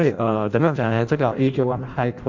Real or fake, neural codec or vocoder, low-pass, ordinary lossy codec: fake; codec, 16 kHz in and 24 kHz out, 0.6 kbps, FireRedTTS-2 codec; 7.2 kHz; Opus, 64 kbps